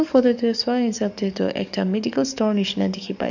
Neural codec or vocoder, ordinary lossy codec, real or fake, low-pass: codec, 44.1 kHz, 7.8 kbps, DAC; none; fake; 7.2 kHz